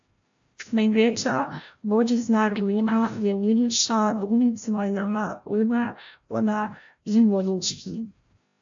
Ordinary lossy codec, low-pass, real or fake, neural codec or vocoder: AAC, 64 kbps; 7.2 kHz; fake; codec, 16 kHz, 0.5 kbps, FreqCodec, larger model